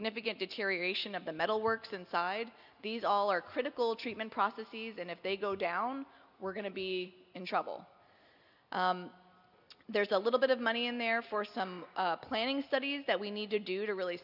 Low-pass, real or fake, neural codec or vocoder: 5.4 kHz; real; none